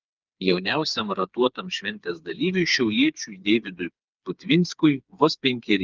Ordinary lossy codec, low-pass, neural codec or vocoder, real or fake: Opus, 24 kbps; 7.2 kHz; codec, 16 kHz, 4 kbps, FreqCodec, smaller model; fake